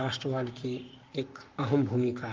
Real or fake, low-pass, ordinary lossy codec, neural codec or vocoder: fake; 7.2 kHz; Opus, 24 kbps; codec, 44.1 kHz, 7.8 kbps, Pupu-Codec